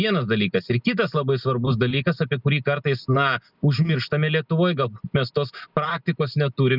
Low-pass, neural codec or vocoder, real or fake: 5.4 kHz; none; real